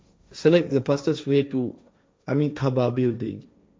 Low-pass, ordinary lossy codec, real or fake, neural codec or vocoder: none; none; fake; codec, 16 kHz, 1.1 kbps, Voila-Tokenizer